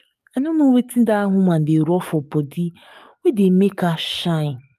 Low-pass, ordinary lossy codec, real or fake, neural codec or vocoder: 14.4 kHz; none; fake; codec, 44.1 kHz, 7.8 kbps, DAC